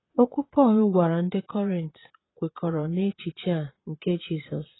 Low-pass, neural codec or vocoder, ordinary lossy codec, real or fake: 7.2 kHz; vocoder, 22.05 kHz, 80 mel bands, Vocos; AAC, 16 kbps; fake